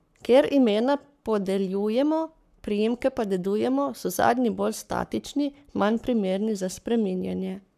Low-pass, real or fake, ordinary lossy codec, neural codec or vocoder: 14.4 kHz; fake; none; codec, 44.1 kHz, 7.8 kbps, Pupu-Codec